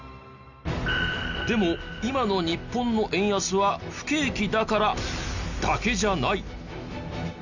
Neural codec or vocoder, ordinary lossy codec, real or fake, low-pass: none; none; real; 7.2 kHz